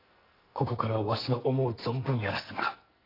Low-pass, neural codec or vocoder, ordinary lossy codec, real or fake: 5.4 kHz; codec, 44.1 kHz, 2.6 kbps, SNAC; AAC, 24 kbps; fake